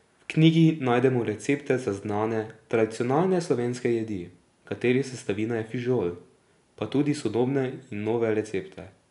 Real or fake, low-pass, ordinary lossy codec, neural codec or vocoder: real; 10.8 kHz; none; none